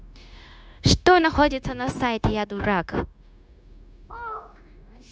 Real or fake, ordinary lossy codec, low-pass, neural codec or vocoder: fake; none; none; codec, 16 kHz, 0.9 kbps, LongCat-Audio-Codec